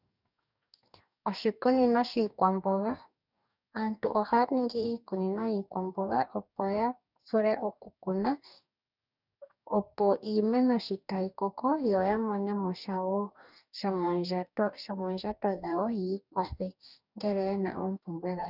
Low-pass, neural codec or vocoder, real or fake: 5.4 kHz; codec, 44.1 kHz, 2.6 kbps, DAC; fake